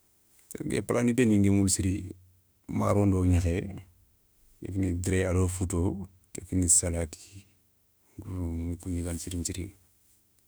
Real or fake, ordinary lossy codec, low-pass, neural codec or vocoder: fake; none; none; autoencoder, 48 kHz, 32 numbers a frame, DAC-VAE, trained on Japanese speech